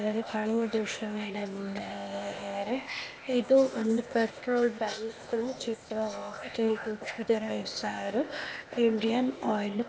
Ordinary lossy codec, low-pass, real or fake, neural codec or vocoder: none; none; fake; codec, 16 kHz, 0.8 kbps, ZipCodec